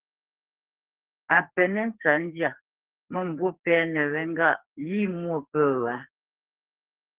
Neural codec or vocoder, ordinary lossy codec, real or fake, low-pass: codec, 44.1 kHz, 2.6 kbps, SNAC; Opus, 16 kbps; fake; 3.6 kHz